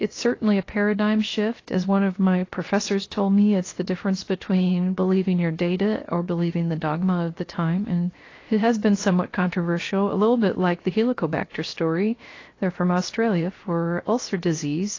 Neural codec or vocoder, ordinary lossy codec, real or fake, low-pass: codec, 16 kHz, 0.7 kbps, FocalCodec; AAC, 32 kbps; fake; 7.2 kHz